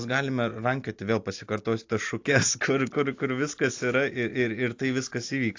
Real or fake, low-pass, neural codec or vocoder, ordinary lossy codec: real; 7.2 kHz; none; AAC, 48 kbps